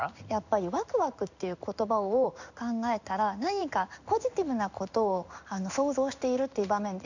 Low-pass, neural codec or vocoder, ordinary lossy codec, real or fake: 7.2 kHz; codec, 16 kHz in and 24 kHz out, 1 kbps, XY-Tokenizer; none; fake